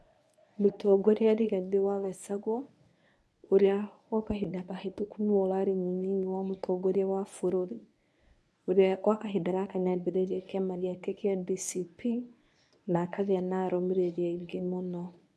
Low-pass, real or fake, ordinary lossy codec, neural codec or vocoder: none; fake; none; codec, 24 kHz, 0.9 kbps, WavTokenizer, medium speech release version 1